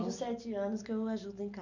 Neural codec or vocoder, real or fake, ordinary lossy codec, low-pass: none; real; none; 7.2 kHz